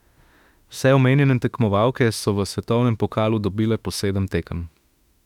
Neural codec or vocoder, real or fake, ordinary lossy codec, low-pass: autoencoder, 48 kHz, 32 numbers a frame, DAC-VAE, trained on Japanese speech; fake; none; 19.8 kHz